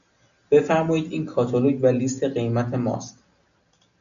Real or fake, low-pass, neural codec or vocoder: real; 7.2 kHz; none